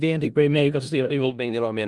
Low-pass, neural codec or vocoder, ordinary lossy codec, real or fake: 10.8 kHz; codec, 16 kHz in and 24 kHz out, 0.4 kbps, LongCat-Audio-Codec, four codebook decoder; Opus, 32 kbps; fake